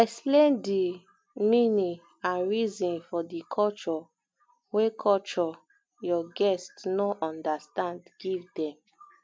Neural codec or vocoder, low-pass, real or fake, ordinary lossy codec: none; none; real; none